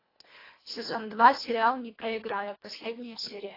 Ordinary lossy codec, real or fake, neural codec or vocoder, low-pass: AAC, 24 kbps; fake; codec, 24 kHz, 1.5 kbps, HILCodec; 5.4 kHz